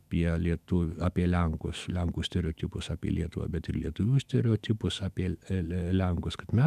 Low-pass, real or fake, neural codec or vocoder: 14.4 kHz; fake; autoencoder, 48 kHz, 128 numbers a frame, DAC-VAE, trained on Japanese speech